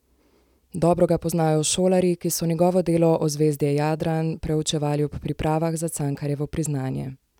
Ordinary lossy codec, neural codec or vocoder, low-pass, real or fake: none; none; 19.8 kHz; real